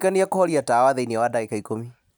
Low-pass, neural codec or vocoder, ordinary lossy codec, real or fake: none; none; none; real